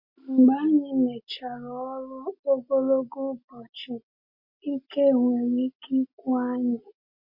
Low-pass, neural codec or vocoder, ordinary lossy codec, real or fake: 5.4 kHz; none; MP3, 32 kbps; real